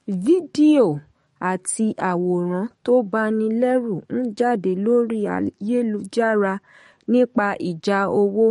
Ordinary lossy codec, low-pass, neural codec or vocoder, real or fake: MP3, 48 kbps; 19.8 kHz; codec, 44.1 kHz, 7.8 kbps, DAC; fake